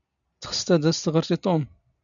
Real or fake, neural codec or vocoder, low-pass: real; none; 7.2 kHz